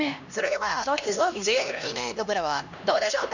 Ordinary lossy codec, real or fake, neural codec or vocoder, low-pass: none; fake; codec, 16 kHz, 1 kbps, X-Codec, HuBERT features, trained on LibriSpeech; 7.2 kHz